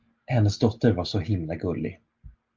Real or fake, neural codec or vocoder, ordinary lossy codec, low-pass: real; none; Opus, 32 kbps; 7.2 kHz